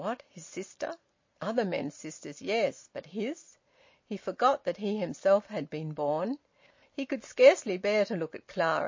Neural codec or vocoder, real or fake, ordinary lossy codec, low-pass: none; real; MP3, 32 kbps; 7.2 kHz